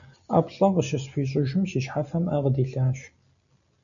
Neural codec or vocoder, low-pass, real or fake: none; 7.2 kHz; real